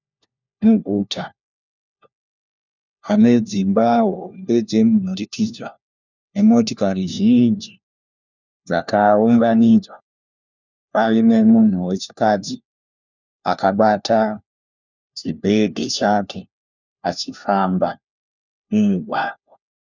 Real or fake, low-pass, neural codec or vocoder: fake; 7.2 kHz; codec, 16 kHz, 1 kbps, FunCodec, trained on LibriTTS, 50 frames a second